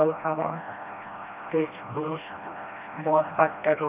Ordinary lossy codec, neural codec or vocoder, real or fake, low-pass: none; codec, 16 kHz, 1 kbps, FreqCodec, smaller model; fake; 3.6 kHz